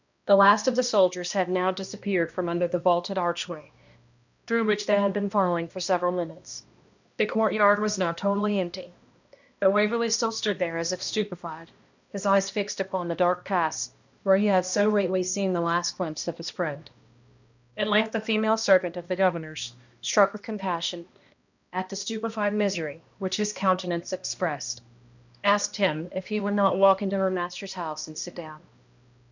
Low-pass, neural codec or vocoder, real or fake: 7.2 kHz; codec, 16 kHz, 1 kbps, X-Codec, HuBERT features, trained on balanced general audio; fake